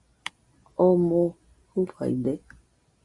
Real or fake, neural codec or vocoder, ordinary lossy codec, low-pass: real; none; Opus, 64 kbps; 10.8 kHz